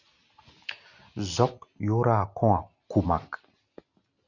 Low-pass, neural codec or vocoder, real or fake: 7.2 kHz; none; real